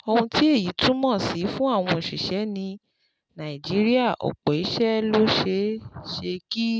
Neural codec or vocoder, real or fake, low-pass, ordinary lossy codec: none; real; none; none